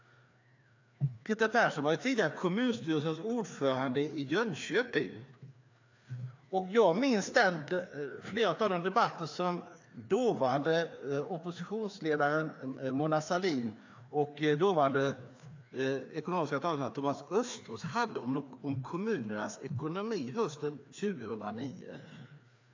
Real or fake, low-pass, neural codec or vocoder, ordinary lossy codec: fake; 7.2 kHz; codec, 16 kHz, 2 kbps, FreqCodec, larger model; AAC, 48 kbps